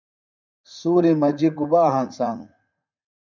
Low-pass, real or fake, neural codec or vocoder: 7.2 kHz; fake; vocoder, 44.1 kHz, 80 mel bands, Vocos